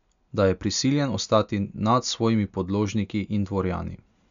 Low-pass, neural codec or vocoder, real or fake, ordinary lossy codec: 7.2 kHz; none; real; none